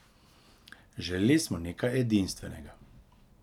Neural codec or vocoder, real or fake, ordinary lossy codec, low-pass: none; real; none; 19.8 kHz